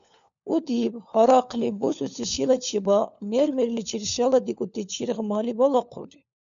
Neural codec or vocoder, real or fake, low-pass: codec, 16 kHz, 4 kbps, FunCodec, trained on LibriTTS, 50 frames a second; fake; 7.2 kHz